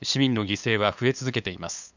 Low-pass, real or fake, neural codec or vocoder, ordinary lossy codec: 7.2 kHz; fake; codec, 16 kHz, 8 kbps, FunCodec, trained on LibriTTS, 25 frames a second; none